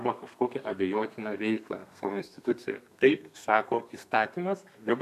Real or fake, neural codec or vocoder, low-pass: fake; codec, 32 kHz, 1.9 kbps, SNAC; 14.4 kHz